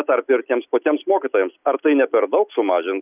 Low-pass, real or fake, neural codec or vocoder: 3.6 kHz; real; none